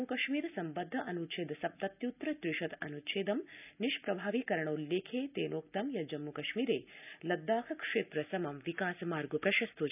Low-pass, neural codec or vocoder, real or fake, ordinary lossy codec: 3.6 kHz; none; real; none